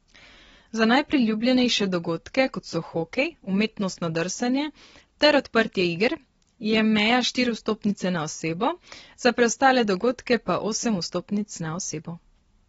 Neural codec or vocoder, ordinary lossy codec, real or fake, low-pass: none; AAC, 24 kbps; real; 19.8 kHz